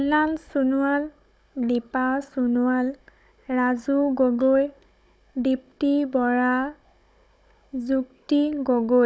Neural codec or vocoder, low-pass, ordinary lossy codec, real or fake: codec, 16 kHz, 16 kbps, FunCodec, trained on Chinese and English, 50 frames a second; none; none; fake